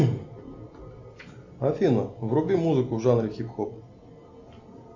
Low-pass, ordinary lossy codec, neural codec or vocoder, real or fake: 7.2 kHz; AAC, 48 kbps; none; real